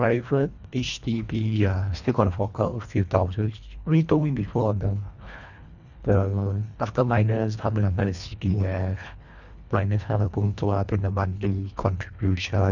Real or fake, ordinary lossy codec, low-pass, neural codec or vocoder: fake; none; 7.2 kHz; codec, 24 kHz, 1.5 kbps, HILCodec